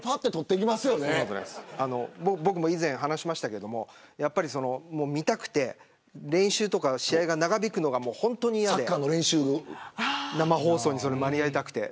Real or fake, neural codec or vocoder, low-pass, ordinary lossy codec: real; none; none; none